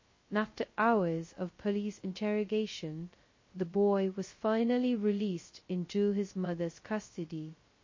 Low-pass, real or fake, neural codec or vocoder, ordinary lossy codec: 7.2 kHz; fake; codec, 16 kHz, 0.2 kbps, FocalCodec; MP3, 32 kbps